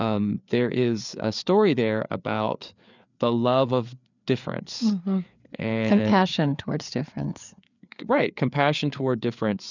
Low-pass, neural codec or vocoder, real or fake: 7.2 kHz; codec, 16 kHz, 4 kbps, FreqCodec, larger model; fake